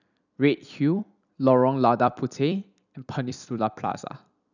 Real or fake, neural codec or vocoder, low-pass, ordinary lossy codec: fake; vocoder, 44.1 kHz, 128 mel bands every 256 samples, BigVGAN v2; 7.2 kHz; none